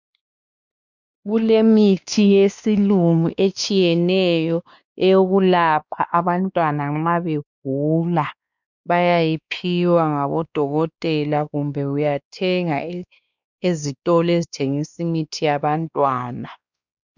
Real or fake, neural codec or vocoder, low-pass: fake; codec, 16 kHz, 2 kbps, X-Codec, WavLM features, trained on Multilingual LibriSpeech; 7.2 kHz